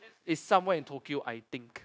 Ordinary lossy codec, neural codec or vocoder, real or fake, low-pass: none; codec, 16 kHz, 0.9 kbps, LongCat-Audio-Codec; fake; none